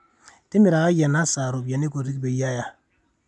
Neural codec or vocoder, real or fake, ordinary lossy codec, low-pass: none; real; none; 10.8 kHz